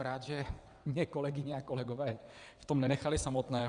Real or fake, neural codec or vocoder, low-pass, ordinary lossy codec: fake; vocoder, 22.05 kHz, 80 mel bands, WaveNeXt; 9.9 kHz; Opus, 64 kbps